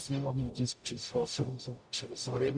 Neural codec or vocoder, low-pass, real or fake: codec, 44.1 kHz, 0.9 kbps, DAC; 9.9 kHz; fake